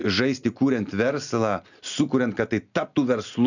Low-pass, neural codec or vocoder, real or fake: 7.2 kHz; none; real